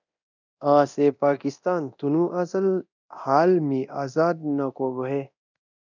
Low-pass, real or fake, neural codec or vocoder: 7.2 kHz; fake; codec, 24 kHz, 0.9 kbps, DualCodec